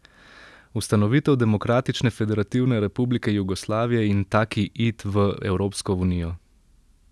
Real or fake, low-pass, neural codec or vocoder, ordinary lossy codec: real; none; none; none